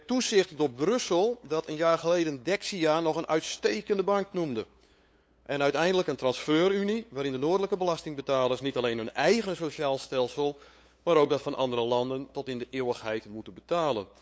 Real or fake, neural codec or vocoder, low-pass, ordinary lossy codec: fake; codec, 16 kHz, 8 kbps, FunCodec, trained on LibriTTS, 25 frames a second; none; none